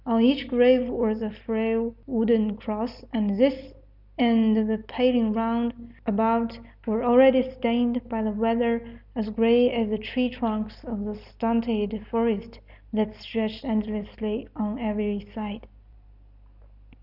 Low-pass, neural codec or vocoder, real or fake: 5.4 kHz; none; real